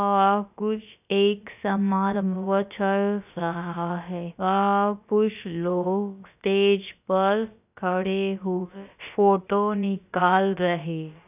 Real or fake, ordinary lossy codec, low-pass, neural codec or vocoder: fake; none; 3.6 kHz; codec, 16 kHz, about 1 kbps, DyCAST, with the encoder's durations